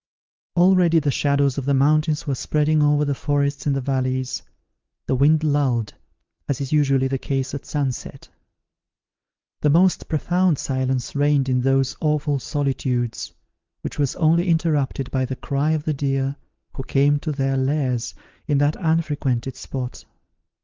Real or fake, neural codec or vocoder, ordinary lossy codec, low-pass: real; none; Opus, 32 kbps; 7.2 kHz